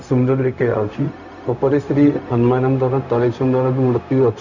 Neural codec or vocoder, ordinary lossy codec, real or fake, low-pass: codec, 16 kHz, 0.4 kbps, LongCat-Audio-Codec; none; fake; 7.2 kHz